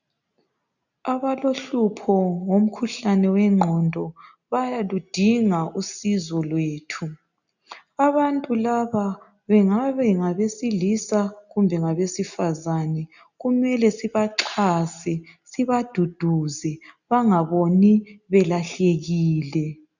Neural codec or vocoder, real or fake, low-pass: none; real; 7.2 kHz